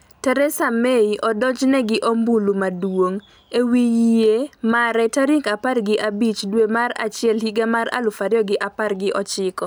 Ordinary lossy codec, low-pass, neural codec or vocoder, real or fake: none; none; none; real